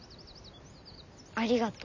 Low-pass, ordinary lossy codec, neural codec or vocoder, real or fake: 7.2 kHz; none; none; real